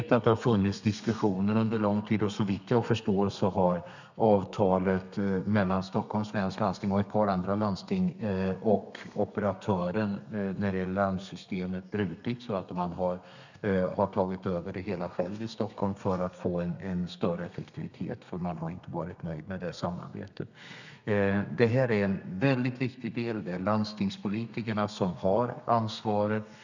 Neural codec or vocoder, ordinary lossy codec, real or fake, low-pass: codec, 32 kHz, 1.9 kbps, SNAC; none; fake; 7.2 kHz